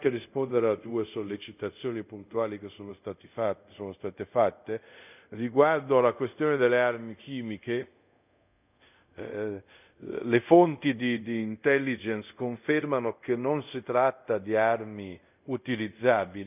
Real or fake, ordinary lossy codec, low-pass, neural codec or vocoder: fake; none; 3.6 kHz; codec, 24 kHz, 0.5 kbps, DualCodec